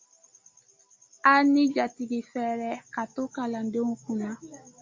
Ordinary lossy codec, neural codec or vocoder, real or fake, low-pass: MP3, 48 kbps; none; real; 7.2 kHz